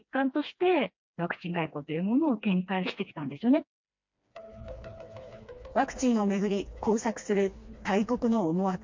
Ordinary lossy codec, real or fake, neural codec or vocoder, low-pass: MP3, 48 kbps; fake; codec, 16 kHz, 2 kbps, FreqCodec, smaller model; 7.2 kHz